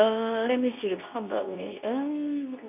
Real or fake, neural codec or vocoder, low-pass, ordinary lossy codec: fake; codec, 24 kHz, 0.9 kbps, WavTokenizer, medium speech release version 2; 3.6 kHz; none